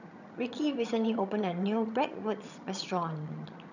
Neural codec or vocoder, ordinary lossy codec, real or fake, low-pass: vocoder, 22.05 kHz, 80 mel bands, HiFi-GAN; none; fake; 7.2 kHz